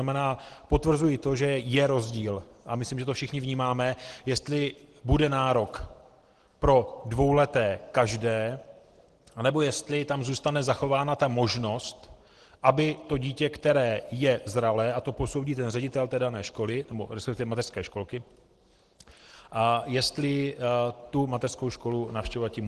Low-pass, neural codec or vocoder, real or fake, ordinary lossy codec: 10.8 kHz; none; real; Opus, 16 kbps